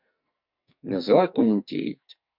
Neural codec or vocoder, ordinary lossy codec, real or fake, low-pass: codec, 44.1 kHz, 2.6 kbps, SNAC; MP3, 48 kbps; fake; 5.4 kHz